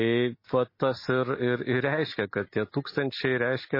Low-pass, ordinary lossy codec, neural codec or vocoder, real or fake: 5.4 kHz; MP3, 24 kbps; none; real